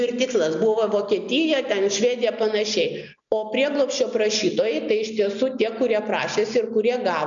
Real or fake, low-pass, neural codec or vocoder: real; 7.2 kHz; none